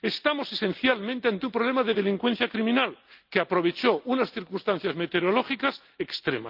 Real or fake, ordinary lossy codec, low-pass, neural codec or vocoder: real; Opus, 24 kbps; 5.4 kHz; none